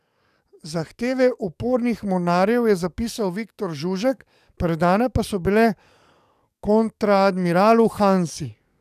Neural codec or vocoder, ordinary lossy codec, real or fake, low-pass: codec, 44.1 kHz, 7.8 kbps, DAC; none; fake; 14.4 kHz